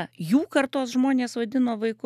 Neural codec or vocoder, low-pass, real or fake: none; 14.4 kHz; real